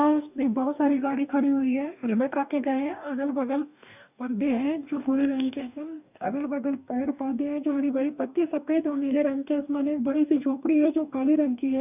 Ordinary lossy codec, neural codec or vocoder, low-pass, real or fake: none; codec, 44.1 kHz, 2.6 kbps, DAC; 3.6 kHz; fake